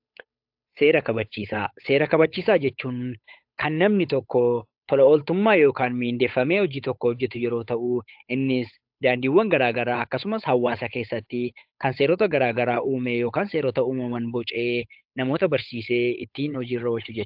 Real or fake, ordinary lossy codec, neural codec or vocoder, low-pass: fake; AAC, 48 kbps; codec, 16 kHz, 8 kbps, FunCodec, trained on Chinese and English, 25 frames a second; 5.4 kHz